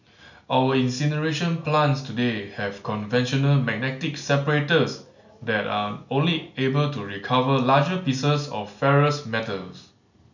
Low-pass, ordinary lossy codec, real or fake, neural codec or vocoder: 7.2 kHz; none; real; none